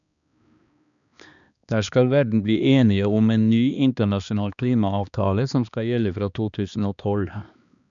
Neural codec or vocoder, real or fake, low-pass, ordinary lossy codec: codec, 16 kHz, 2 kbps, X-Codec, HuBERT features, trained on balanced general audio; fake; 7.2 kHz; none